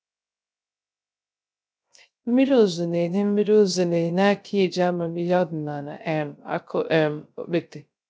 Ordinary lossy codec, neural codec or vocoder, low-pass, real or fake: none; codec, 16 kHz, 0.3 kbps, FocalCodec; none; fake